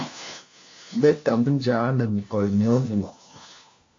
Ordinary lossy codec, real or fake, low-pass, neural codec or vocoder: AAC, 48 kbps; fake; 7.2 kHz; codec, 16 kHz, 1 kbps, FunCodec, trained on LibriTTS, 50 frames a second